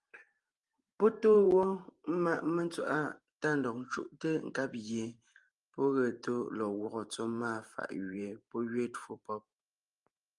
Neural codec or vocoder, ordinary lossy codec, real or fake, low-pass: vocoder, 44.1 kHz, 128 mel bands every 512 samples, BigVGAN v2; Opus, 32 kbps; fake; 10.8 kHz